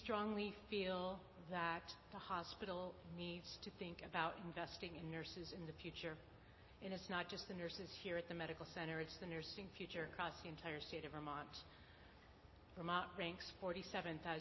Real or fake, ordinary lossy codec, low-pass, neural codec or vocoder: real; MP3, 24 kbps; 7.2 kHz; none